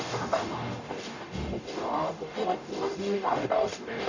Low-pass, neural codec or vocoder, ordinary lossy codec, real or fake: 7.2 kHz; codec, 44.1 kHz, 0.9 kbps, DAC; none; fake